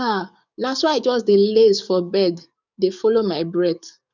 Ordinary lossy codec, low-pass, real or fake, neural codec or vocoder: none; 7.2 kHz; fake; codec, 44.1 kHz, 7.8 kbps, DAC